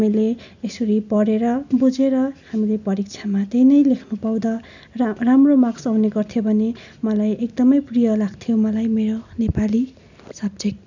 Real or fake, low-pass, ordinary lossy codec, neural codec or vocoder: real; 7.2 kHz; none; none